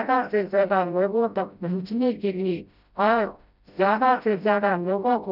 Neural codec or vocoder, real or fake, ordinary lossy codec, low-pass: codec, 16 kHz, 0.5 kbps, FreqCodec, smaller model; fake; none; 5.4 kHz